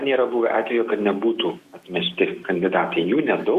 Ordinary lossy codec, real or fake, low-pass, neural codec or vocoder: Opus, 64 kbps; fake; 14.4 kHz; autoencoder, 48 kHz, 128 numbers a frame, DAC-VAE, trained on Japanese speech